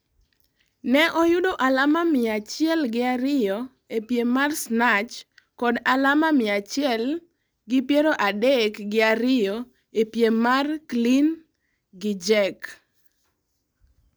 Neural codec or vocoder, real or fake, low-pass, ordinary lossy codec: none; real; none; none